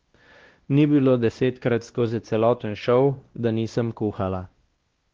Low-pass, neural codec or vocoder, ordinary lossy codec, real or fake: 7.2 kHz; codec, 16 kHz, 1 kbps, X-Codec, WavLM features, trained on Multilingual LibriSpeech; Opus, 16 kbps; fake